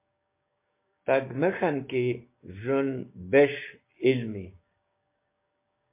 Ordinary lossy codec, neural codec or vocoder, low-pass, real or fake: MP3, 24 kbps; codec, 16 kHz, 6 kbps, DAC; 3.6 kHz; fake